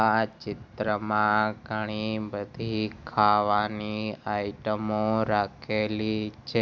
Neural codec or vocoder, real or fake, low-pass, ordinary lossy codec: none; real; none; none